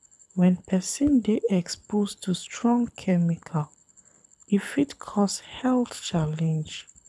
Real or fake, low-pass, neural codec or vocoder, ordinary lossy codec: fake; none; codec, 24 kHz, 6 kbps, HILCodec; none